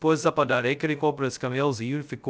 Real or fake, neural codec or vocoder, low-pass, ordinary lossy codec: fake; codec, 16 kHz, 0.2 kbps, FocalCodec; none; none